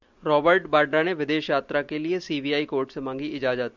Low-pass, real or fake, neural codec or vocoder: 7.2 kHz; real; none